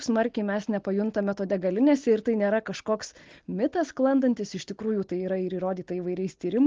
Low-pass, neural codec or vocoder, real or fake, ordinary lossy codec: 7.2 kHz; none; real; Opus, 16 kbps